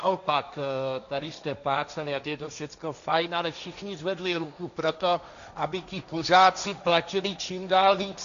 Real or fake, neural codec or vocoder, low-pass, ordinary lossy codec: fake; codec, 16 kHz, 1.1 kbps, Voila-Tokenizer; 7.2 kHz; MP3, 96 kbps